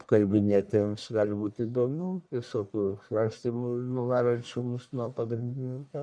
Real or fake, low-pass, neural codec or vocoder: fake; 9.9 kHz; codec, 44.1 kHz, 1.7 kbps, Pupu-Codec